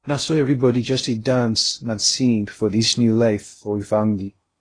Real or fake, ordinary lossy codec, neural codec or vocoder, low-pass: fake; AAC, 32 kbps; codec, 16 kHz in and 24 kHz out, 0.6 kbps, FocalCodec, streaming, 2048 codes; 9.9 kHz